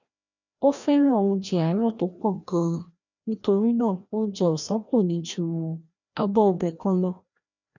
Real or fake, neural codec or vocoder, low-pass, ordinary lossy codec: fake; codec, 16 kHz, 1 kbps, FreqCodec, larger model; 7.2 kHz; none